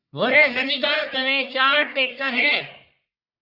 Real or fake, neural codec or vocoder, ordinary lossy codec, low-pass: fake; codec, 44.1 kHz, 1.7 kbps, Pupu-Codec; Opus, 64 kbps; 5.4 kHz